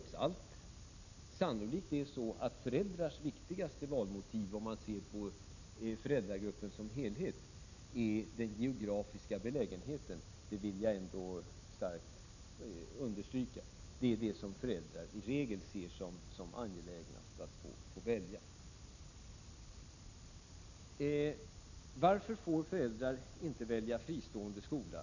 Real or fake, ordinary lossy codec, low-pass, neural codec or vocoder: real; none; 7.2 kHz; none